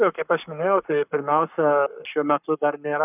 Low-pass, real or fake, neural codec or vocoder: 3.6 kHz; fake; codec, 44.1 kHz, 7.8 kbps, Pupu-Codec